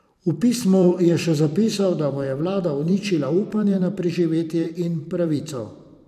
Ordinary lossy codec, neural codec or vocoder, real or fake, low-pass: none; vocoder, 44.1 kHz, 128 mel bands every 512 samples, BigVGAN v2; fake; 14.4 kHz